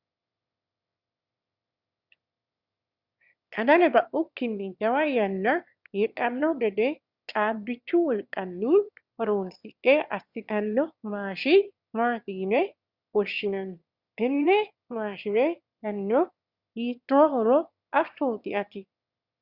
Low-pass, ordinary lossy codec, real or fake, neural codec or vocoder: 5.4 kHz; Opus, 64 kbps; fake; autoencoder, 22.05 kHz, a latent of 192 numbers a frame, VITS, trained on one speaker